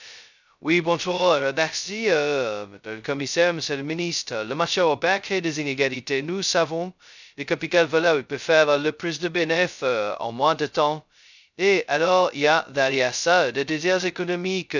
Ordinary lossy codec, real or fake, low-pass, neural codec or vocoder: none; fake; 7.2 kHz; codec, 16 kHz, 0.2 kbps, FocalCodec